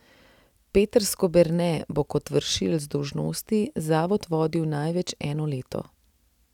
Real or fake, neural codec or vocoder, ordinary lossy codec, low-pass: real; none; none; 19.8 kHz